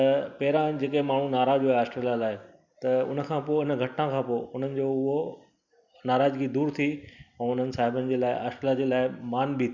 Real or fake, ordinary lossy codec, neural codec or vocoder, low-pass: real; none; none; 7.2 kHz